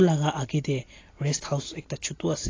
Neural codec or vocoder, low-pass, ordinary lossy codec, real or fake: none; 7.2 kHz; AAC, 32 kbps; real